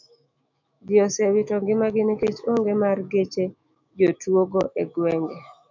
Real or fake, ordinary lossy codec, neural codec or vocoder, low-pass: fake; MP3, 64 kbps; autoencoder, 48 kHz, 128 numbers a frame, DAC-VAE, trained on Japanese speech; 7.2 kHz